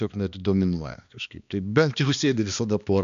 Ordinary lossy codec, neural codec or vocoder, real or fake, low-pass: MP3, 64 kbps; codec, 16 kHz, 2 kbps, X-Codec, HuBERT features, trained on balanced general audio; fake; 7.2 kHz